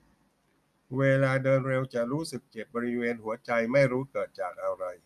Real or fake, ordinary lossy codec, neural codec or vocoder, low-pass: real; none; none; 14.4 kHz